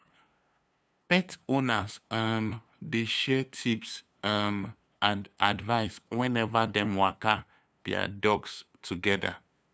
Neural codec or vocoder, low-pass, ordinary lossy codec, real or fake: codec, 16 kHz, 2 kbps, FunCodec, trained on LibriTTS, 25 frames a second; none; none; fake